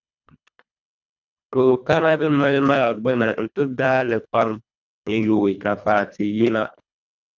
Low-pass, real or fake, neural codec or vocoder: 7.2 kHz; fake; codec, 24 kHz, 1.5 kbps, HILCodec